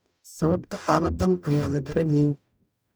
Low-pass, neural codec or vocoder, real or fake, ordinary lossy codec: none; codec, 44.1 kHz, 0.9 kbps, DAC; fake; none